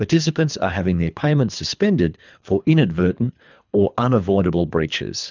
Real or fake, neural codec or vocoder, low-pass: fake; codec, 24 kHz, 3 kbps, HILCodec; 7.2 kHz